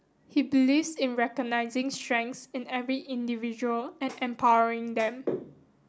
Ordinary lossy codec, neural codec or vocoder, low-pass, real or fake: none; none; none; real